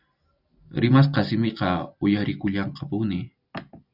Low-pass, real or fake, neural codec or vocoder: 5.4 kHz; real; none